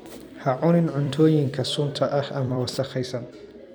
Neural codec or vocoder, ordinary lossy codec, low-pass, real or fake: vocoder, 44.1 kHz, 128 mel bands every 256 samples, BigVGAN v2; none; none; fake